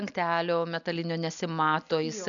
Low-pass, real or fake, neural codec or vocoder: 7.2 kHz; real; none